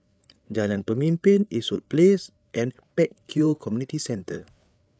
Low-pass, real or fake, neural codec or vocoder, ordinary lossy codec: none; fake; codec, 16 kHz, 8 kbps, FreqCodec, larger model; none